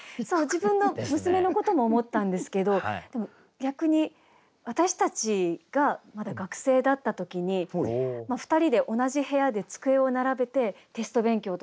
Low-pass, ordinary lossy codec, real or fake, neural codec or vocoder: none; none; real; none